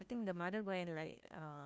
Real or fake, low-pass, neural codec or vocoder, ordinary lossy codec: fake; none; codec, 16 kHz, 1 kbps, FunCodec, trained on LibriTTS, 50 frames a second; none